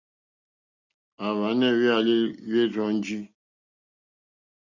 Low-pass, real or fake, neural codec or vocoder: 7.2 kHz; real; none